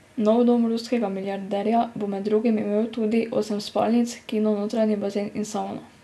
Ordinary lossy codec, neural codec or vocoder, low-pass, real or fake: none; none; none; real